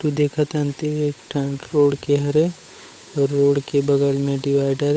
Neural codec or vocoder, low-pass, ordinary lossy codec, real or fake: codec, 16 kHz, 8 kbps, FunCodec, trained on Chinese and English, 25 frames a second; none; none; fake